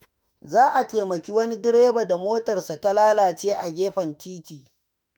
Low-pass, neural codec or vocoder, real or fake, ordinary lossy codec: none; autoencoder, 48 kHz, 32 numbers a frame, DAC-VAE, trained on Japanese speech; fake; none